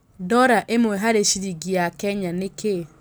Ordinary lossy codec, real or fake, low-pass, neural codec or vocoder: none; real; none; none